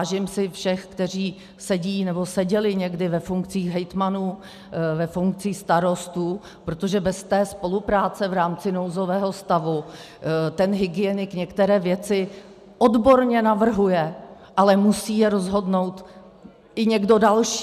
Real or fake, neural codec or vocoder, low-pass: real; none; 14.4 kHz